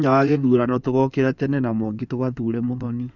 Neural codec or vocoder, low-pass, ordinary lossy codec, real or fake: codec, 16 kHz in and 24 kHz out, 2.2 kbps, FireRedTTS-2 codec; 7.2 kHz; none; fake